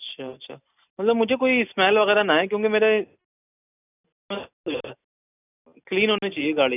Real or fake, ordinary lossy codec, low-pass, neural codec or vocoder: real; none; 3.6 kHz; none